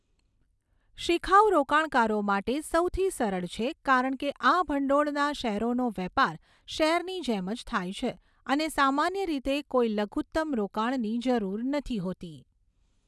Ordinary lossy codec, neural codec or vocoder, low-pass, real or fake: none; none; none; real